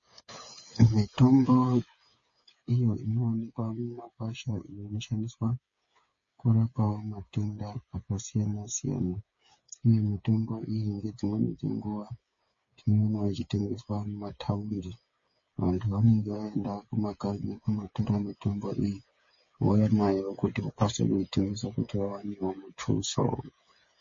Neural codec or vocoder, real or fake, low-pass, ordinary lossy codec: codec, 16 kHz, 8 kbps, FreqCodec, smaller model; fake; 7.2 kHz; MP3, 32 kbps